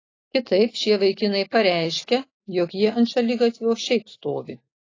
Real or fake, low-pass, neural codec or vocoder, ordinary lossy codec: real; 7.2 kHz; none; AAC, 32 kbps